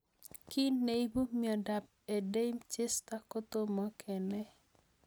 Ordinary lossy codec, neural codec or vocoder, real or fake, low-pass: none; none; real; none